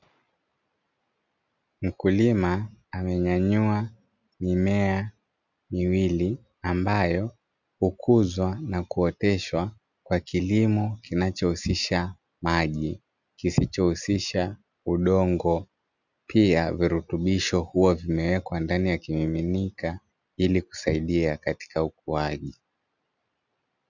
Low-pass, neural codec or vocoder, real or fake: 7.2 kHz; none; real